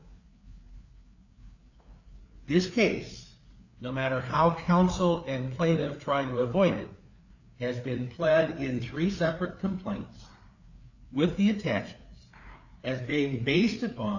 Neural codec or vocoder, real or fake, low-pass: codec, 16 kHz, 4 kbps, FreqCodec, larger model; fake; 7.2 kHz